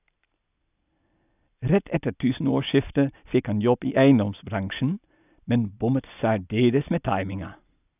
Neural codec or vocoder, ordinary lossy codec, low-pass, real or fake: none; none; 3.6 kHz; real